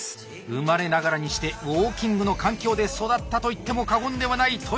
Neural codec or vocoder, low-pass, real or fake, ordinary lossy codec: none; none; real; none